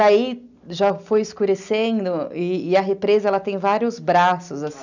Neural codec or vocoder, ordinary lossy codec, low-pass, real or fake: none; none; 7.2 kHz; real